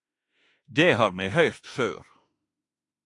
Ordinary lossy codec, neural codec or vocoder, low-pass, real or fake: AAC, 48 kbps; autoencoder, 48 kHz, 32 numbers a frame, DAC-VAE, trained on Japanese speech; 10.8 kHz; fake